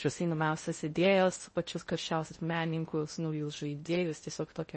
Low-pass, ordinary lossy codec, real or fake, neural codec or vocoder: 10.8 kHz; MP3, 32 kbps; fake; codec, 16 kHz in and 24 kHz out, 0.6 kbps, FocalCodec, streaming, 4096 codes